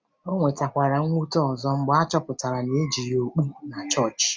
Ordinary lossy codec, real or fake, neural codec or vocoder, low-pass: none; real; none; none